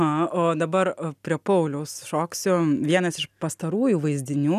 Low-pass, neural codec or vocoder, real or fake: 14.4 kHz; none; real